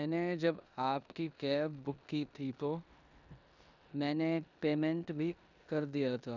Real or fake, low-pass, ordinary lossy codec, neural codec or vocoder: fake; 7.2 kHz; none; codec, 16 kHz in and 24 kHz out, 0.9 kbps, LongCat-Audio-Codec, four codebook decoder